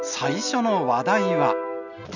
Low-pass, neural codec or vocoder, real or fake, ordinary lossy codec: 7.2 kHz; none; real; none